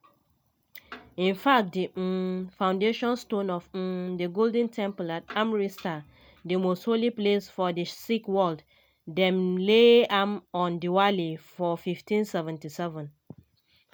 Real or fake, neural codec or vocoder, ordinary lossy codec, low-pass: real; none; MP3, 96 kbps; 19.8 kHz